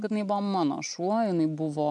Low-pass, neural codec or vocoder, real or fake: 10.8 kHz; none; real